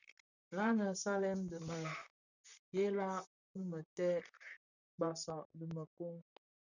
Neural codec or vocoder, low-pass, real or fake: vocoder, 44.1 kHz, 128 mel bands, Pupu-Vocoder; 7.2 kHz; fake